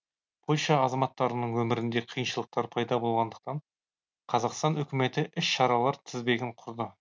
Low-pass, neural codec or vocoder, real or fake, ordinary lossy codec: none; none; real; none